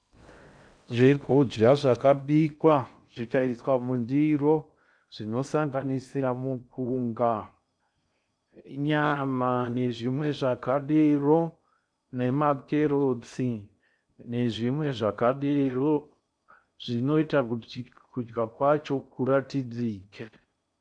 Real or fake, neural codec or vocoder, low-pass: fake; codec, 16 kHz in and 24 kHz out, 0.6 kbps, FocalCodec, streaming, 2048 codes; 9.9 kHz